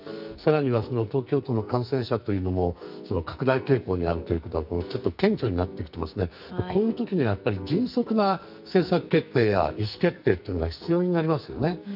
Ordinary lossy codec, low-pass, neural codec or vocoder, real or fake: none; 5.4 kHz; codec, 44.1 kHz, 2.6 kbps, SNAC; fake